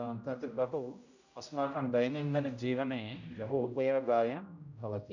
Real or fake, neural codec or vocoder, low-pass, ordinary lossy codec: fake; codec, 16 kHz, 0.5 kbps, X-Codec, HuBERT features, trained on general audio; 7.2 kHz; none